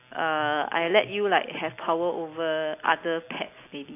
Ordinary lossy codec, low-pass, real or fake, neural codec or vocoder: AAC, 24 kbps; 3.6 kHz; real; none